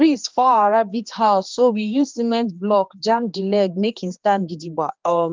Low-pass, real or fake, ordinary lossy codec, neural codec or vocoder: 7.2 kHz; fake; Opus, 24 kbps; codec, 16 kHz, 2 kbps, X-Codec, HuBERT features, trained on general audio